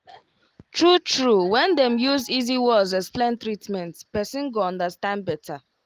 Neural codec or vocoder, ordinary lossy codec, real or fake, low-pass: none; Opus, 24 kbps; real; 14.4 kHz